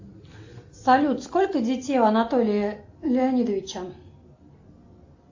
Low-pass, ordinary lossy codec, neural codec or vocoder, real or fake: 7.2 kHz; AAC, 48 kbps; none; real